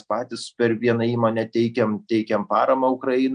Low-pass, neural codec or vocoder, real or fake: 9.9 kHz; none; real